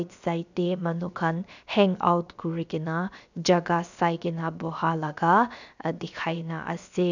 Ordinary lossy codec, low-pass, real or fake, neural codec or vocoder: none; 7.2 kHz; fake; codec, 16 kHz, 0.7 kbps, FocalCodec